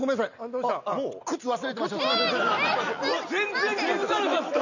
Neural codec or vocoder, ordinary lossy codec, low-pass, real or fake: none; none; 7.2 kHz; real